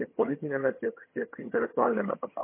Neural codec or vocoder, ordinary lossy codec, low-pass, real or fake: vocoder, 22.05 kHz, 80 mel bands, HiFi-GAN; MP3, 24 kbps; 3.6 kHz; fake